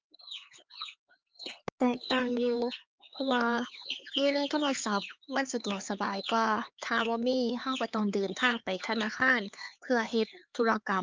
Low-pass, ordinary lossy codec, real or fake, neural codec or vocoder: 7.2 kHz; Opus, 24 kbps; fake; codec, 16 kHz, 4 kbps, X-Codec, HuBERT features, trained on LibriSpeech